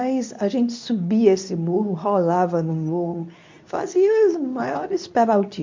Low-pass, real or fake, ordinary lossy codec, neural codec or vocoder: 7.2 kHz; fake; MP3, 64 kbps; codec, 24 kHz, 0.9 kbps, WavTokenizer, medium speech release version 2